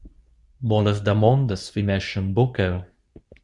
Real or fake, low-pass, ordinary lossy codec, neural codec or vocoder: fake; 10.8 kHz; Opus, 64 kbps; codec, 24 kHz, 0.9 kbps, WavTokenizer, medium speech release version 2